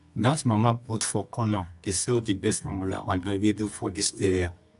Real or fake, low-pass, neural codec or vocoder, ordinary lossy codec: fake; 10.8 kHz; codec, 24 kHz, 0.9 kbps, WavTokenizer, medium music audio release; MP3, 96 kbps